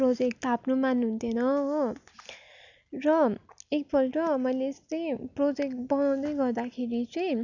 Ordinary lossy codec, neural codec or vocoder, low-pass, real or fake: none; none; 7.2 kHz; real